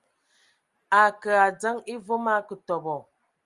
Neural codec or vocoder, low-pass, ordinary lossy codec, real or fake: none; 10.8 kHz; Opus, 32 kbps; real